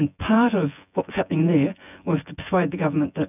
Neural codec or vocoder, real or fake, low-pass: vocoder, 24 kHz, 100 mel bands, Vocos; fake; 3.6 kHz